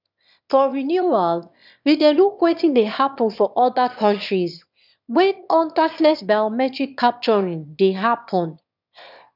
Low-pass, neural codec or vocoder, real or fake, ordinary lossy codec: 5.4 kHz; autoencoder, 22.05 kHz, a latent of 192 numbers a frame, VITS, trained on one speaker; fake; none